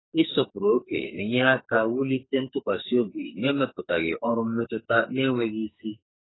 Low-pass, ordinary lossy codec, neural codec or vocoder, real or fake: 7.2 kHz; AAC, 16 kbps; codec, 44.1 kHz, 2.6 kbps, SNAC; fake